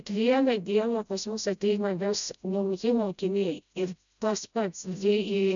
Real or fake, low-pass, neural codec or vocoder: fake; 7.2 kHz; codec, 16 kHz, 0.5 kbps, FreqCodec, smaller model